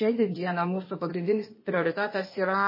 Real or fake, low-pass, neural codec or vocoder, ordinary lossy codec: fake; 5.4 kHz; codec, 16 kHz, 0.8 kbps, ZipCodec; MP3, 24 kbps